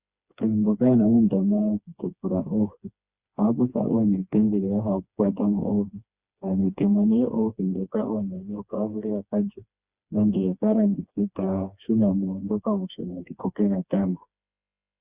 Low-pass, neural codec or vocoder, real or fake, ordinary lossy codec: 3.6 kHz; codec, 16 kHz, 2 kbps, FreqCodec, smaller model; fake; Opus, 64 kbps